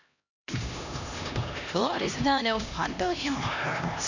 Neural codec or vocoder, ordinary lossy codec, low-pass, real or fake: codec, 16 kHz, 1 kbps, X-Codec, HuBERT features, trained on LibriSpeech; none; 7.2 kHz; fake